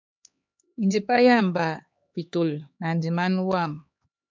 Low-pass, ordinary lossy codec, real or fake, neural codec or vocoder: 7.2 kHz; MP3, 64 kbps; fake; codec, 16 kHz, 2 kbps, X-Codec, HuBERT features, trained on LibriSpeech